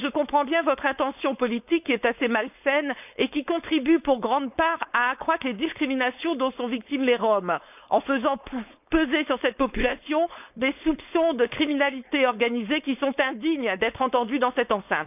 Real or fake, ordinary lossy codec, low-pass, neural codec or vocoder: fake; none; 3.6 kHz; codec, 16 kHz, 4.8 kbps, FACodec